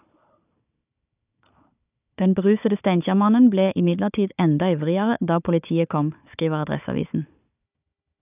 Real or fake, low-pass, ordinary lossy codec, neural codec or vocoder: fake; 3.6 kHz; none; codec, 16 kHz, 4 kbps, FunCodec, trained on Chinese and English, 50 frames a second